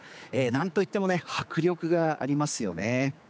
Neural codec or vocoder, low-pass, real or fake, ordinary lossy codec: codec, 16 kHz, 4 kbps, X-Codec, HuBERT features, trained on general audio; none; fake; none